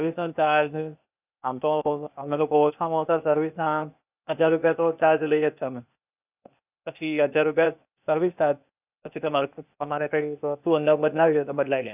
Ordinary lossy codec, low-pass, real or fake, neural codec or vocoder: none; 3.6 kHz; fake; codec, 16 kHz, 0.8 kbps, ZipCodec